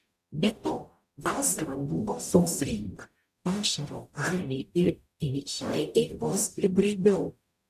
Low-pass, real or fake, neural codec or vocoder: 14.4 kHz; fake; codec, 44.1 kHz, 0.9 kbps, DAC